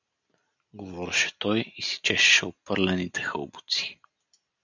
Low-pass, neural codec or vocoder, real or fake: 7.2 kHz; none; real